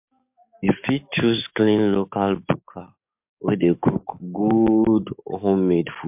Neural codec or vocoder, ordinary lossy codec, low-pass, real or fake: codec, 44.1 kHz, 7.8 kbps, DAC; MP3, 32 kbps; 3.6 kHz; fake